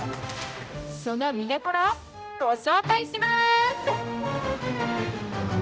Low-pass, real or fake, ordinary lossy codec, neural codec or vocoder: none; fake; none; codec, 16 kHz, 0.5 kbps, X-Codec, HuBERT features, trained on general audio